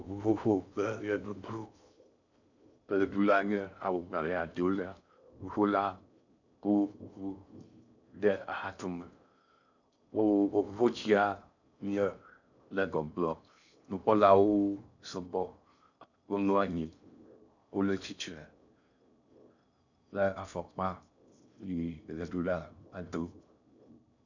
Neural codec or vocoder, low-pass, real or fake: codec, 16 kHz in and 24 kHz out, 0.6 kbps, FocalCodec, streaming, 2048 codes; 7.2 kHz; fake